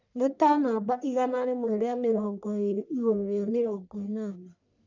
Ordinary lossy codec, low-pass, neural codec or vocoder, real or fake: none; 7.2 kHz; codec, 44.1 kHz, 1.7 kbps, Pupu-Codec; fake